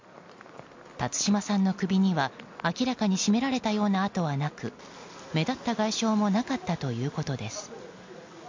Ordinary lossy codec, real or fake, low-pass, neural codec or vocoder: MP3, 48 kbps; real; 7.2 kHz; none